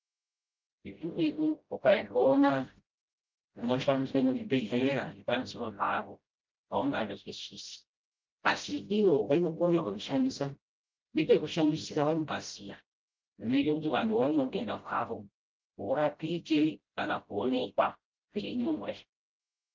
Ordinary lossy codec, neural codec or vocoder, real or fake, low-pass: Opus, 32 kbps; codec, 16 kHz, 0.5 kbps, FreqCodec, smaller model; fake; 7.2 kHz